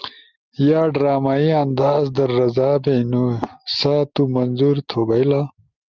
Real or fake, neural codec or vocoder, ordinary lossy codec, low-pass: real; none; Opus, 24 kbps; 7.2 kHz